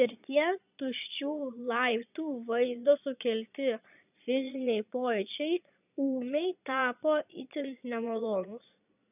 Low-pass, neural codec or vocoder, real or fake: 3.6 kHz; vocoder, 22.05 kHz, 80 mel bands, Vocos; fake